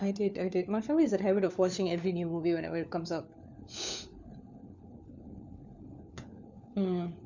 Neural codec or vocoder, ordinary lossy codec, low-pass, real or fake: codec, 16 kHz, 4 kbps, FunCodec, trained on LibriTTS, 50 frames a second; none; 7.2 kHz; fake